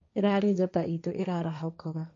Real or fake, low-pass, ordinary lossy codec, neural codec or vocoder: fake; 7.2 kHz; MP3, 48 kbps; codec, 16 kHz, 1.1 kbps, Voila-Tokenizer